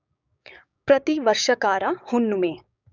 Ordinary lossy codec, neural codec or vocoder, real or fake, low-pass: none; codec, 16 kHz, 6 kbps, DAC; fake; 7.2 kHz